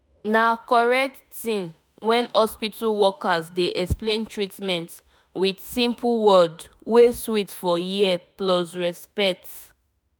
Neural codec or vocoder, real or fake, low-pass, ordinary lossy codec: autoencoder, 48 kHz, 32 numbers a frame, DAC-VAE, trained on Japanese speech; fake; none; none